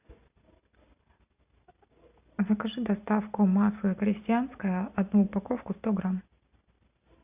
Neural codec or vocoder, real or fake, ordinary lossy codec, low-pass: none; real; Opus, 64 kbps; 3.6 kHz